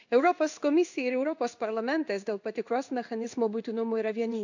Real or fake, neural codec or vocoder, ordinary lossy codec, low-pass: fake; codec, 16 kHz in and 24 kHz out, 1 kbps, XY-Tokenizer; none; 7.2 kHz